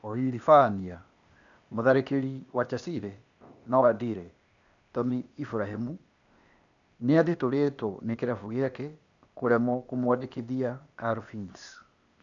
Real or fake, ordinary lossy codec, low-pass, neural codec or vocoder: fake; none; 7.2 kHz; codec, 16 kHz, 0.8 kbps, ZipCodec